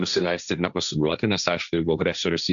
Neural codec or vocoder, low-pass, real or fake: codec, 16 kHz, 1.1 kbps, Voila-Tokenizer; 7.2 kHz; fake